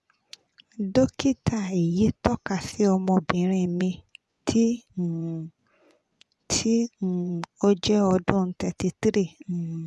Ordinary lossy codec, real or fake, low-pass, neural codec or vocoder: none; fake; none; vocoder, 24 kHz, 100 mel bands, Vocos